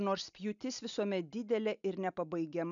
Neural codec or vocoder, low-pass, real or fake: none; 7.2 kHz; real